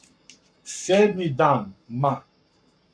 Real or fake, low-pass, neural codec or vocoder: fake; 9.9 kHz; codec, 44.1 kHz, 7.8 kbps, Pupu-Codec